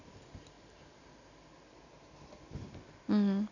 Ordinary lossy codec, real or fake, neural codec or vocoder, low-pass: none; real; none; 7.2 kHz